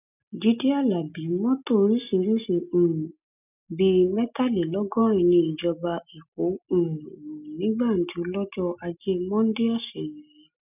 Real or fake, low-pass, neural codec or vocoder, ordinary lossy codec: real; 3.6 kHz; none; none